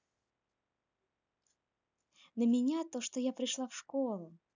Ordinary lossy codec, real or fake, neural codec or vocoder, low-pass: none; real; none; 7.2 kHz